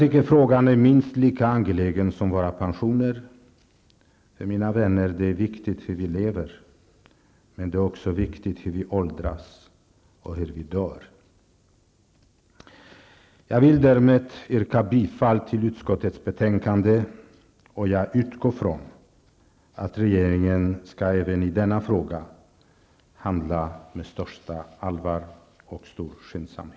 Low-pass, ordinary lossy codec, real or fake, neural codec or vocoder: none; none; real; none